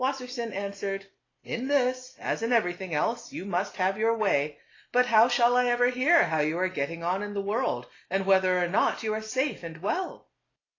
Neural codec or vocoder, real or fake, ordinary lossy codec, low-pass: none; real; AAC, 32 kbps; 7.2 kHz